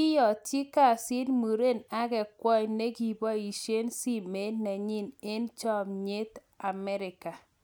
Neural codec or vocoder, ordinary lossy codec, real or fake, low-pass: none; none; real; none